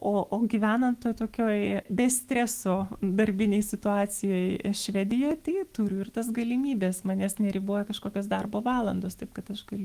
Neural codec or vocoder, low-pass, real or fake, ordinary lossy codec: autoencoder, 48 kHz, 128 numbers a frame, DAC-VAE, trained on Japanese speech; 14.4 kHz; fake; Opus, 24 kbps